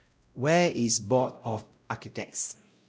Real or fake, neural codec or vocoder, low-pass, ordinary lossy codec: fake; codec, 16 kHz, 0.5 kbps, X-Codec, WavLM features, trained on Multilingual LibriSpeech; none; none